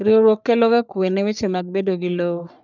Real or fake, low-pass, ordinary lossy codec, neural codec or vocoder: fake; 7.2 kHz; none; codec, 44.1 kHz, 3.4 kbps, Pupu-Codec